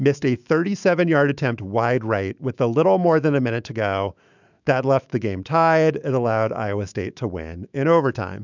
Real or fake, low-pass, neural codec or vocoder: fake; 7.2 kHz; autoencoder, 48 kHz, 128 numbers a frame, DAC-VAE, trained on Japanese speech